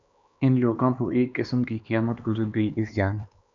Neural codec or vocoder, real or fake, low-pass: codec, 16 kHz, 2 kbps, X-Codec, HuBERT features, trained on LibriSpeech; fake; 7.2 kHz